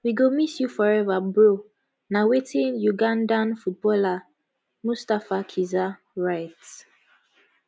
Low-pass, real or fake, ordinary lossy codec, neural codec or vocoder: none; real; none; none